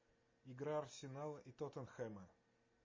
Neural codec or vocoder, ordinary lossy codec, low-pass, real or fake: none; MP3, 32 kbps; 7.2 kHz; real